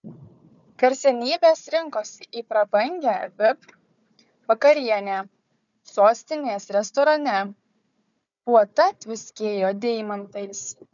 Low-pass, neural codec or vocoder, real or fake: 7.2 kHz; codec, 16 kHz, 4 kbps, FunCodec, trained on Chinese and English, 50 frames a second; fake